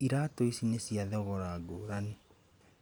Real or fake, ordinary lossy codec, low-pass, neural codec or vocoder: fake; none; none; vocoder, 44.1 kHz, 128 mel bands every 512 samples, BigVGAN v2